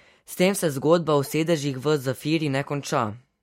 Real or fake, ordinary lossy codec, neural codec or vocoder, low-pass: real; MP3, 64 kbps; none; 19.8 kHz